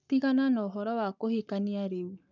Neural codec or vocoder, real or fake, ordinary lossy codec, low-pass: codec, 44.1 kHz, 7.8 kbps, Pupu-Codec; fake; none; 7.2 kHz